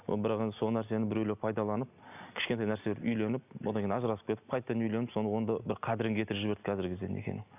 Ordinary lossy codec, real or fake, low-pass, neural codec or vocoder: none; real; 3.6 kHz; none